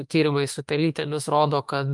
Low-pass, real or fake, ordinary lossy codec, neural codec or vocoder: 10.8 kHz; fake; Opus, 32 kbps; autoencoder, 48 kHz, 32 numbers a frame, DAC-VAE, trained on Japanese speech